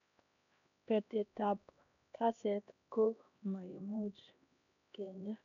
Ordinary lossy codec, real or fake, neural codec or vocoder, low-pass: none; fake; codec, 16 kHz, 1 kbps, X-Codec, HuBERT features, trained on LibriSpeech; 7.2 kHz